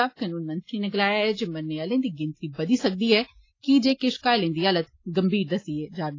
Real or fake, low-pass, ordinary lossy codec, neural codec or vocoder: real; 7.2 kHz; AAC, 32 kbps; none